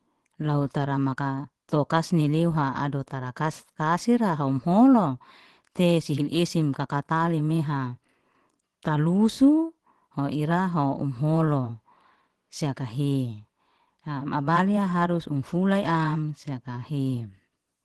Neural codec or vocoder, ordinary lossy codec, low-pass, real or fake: vocoder, 24 kHz, 100 mel bands, Vocos; Opus, 16 kbps; 10.8 kHz; fake